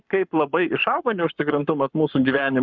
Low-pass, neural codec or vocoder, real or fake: 7.2 kHz; codec, 44.1 kHz, 7.8 kbps, Pupu-Codec; fake